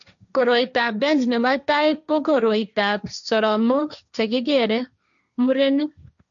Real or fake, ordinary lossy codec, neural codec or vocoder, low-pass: fake; none; codec, 16 kHz, 1.1 kbps, Voila-Tokenizer; 7.2 kHz